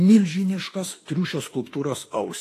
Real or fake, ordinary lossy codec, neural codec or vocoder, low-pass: fake; AAC, 48 kbps; autoencoder, 48 kHz, 32 numbers a frame, DAC-VAE, trained on Japanese speech; 14.4 kHz